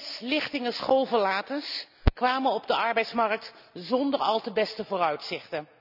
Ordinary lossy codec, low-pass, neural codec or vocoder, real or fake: none; 5.4 kHz; none; real